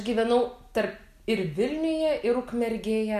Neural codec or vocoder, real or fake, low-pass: none; real; 14.4 kHz